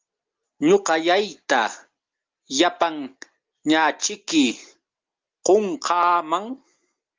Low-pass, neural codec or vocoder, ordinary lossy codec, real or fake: 7.2 kHz; none; Opus, 24 kbps; real